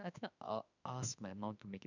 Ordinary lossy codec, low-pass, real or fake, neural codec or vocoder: none; 7.2 kHz; fake; autoencoder, 48 kHz, 32 numbers a frame, DAC-VAE, trained on Japanese speech